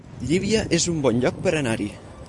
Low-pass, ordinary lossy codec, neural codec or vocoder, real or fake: 10.8 kHz; AAC, 64 kbps; none; real